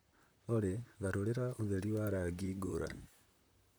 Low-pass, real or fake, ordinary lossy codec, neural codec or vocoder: none; fake; none; vocoder, 44.1 kHz, 128 mel bands, Pupu-Vocoder